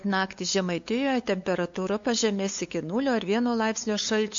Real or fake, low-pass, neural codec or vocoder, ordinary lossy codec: fake; 7.2 kHz; codec, 16 kHz, 8 kbps, FunCodec, trained on LibriTTS, 25 frames a second; MP3, 48 kbps